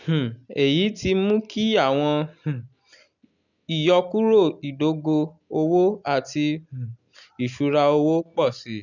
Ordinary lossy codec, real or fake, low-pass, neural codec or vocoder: none; real; 7.2 kHz; none